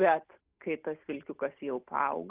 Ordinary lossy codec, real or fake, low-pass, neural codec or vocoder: Opus, 32 kbps; real; 3.6 kHz; none